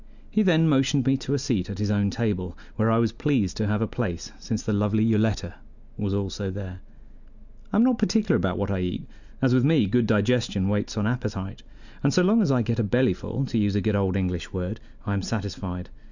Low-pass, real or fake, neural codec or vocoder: 7.2 kHz; real; none